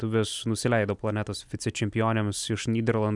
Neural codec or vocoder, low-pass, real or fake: vocoder, 44.1 kHz, 128 mel bands every 256 samples, BigVGAN v2; 10.8 kHz; fake